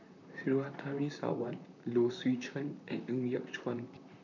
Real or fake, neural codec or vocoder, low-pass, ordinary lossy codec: fake; vocoder, 44.1 kHz, 128 mel bands, Pupu-Vocoder; 7.2 kHz; none